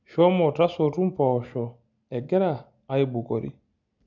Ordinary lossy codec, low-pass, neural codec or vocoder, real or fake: none; 7.2 kHz; none; real